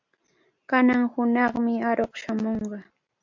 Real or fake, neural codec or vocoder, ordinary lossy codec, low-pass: real; none; MP3, 48 kbps; 7.2 kHz